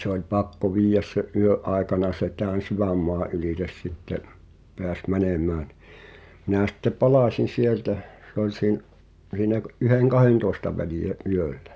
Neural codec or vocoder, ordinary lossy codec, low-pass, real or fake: none; none; none; real